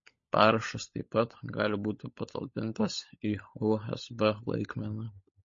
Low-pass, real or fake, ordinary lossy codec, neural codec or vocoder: 7.2 kHz; fake; MP3, 32 kbps; codec, 16 kHz, 16 kbps, FunCodec, trained on LibriTTS, 50 frames a second